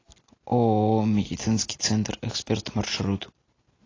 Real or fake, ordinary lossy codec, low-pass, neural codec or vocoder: real; AAC, 32 kbps; 7.2 kHz; none